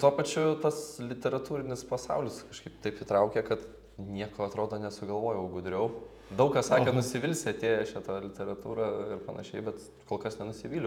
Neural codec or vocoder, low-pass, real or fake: vocoder, 48 kHz, 128 mel bands, Vocos; 19.8 kHz; fake